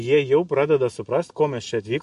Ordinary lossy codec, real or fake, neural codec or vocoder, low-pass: MP3, 48 kbps; real; none; 14.4 kHz